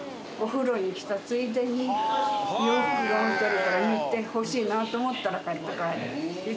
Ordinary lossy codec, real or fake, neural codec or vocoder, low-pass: none; real; none; none